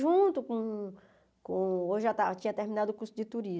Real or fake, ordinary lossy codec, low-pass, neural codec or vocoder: real; none; none; none